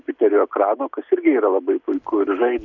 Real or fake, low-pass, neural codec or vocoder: real; 7.2 kHz; none